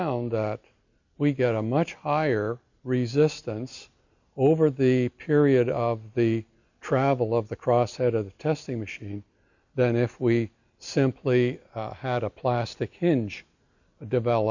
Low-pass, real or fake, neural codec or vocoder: 7.2 kHz; real; none